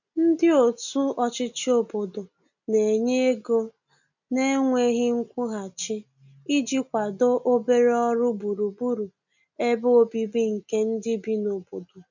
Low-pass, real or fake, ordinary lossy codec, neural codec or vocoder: 7.2 kHz; real; none; none